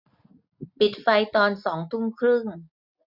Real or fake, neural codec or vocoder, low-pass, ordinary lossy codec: real; none; 5.4 kHz; none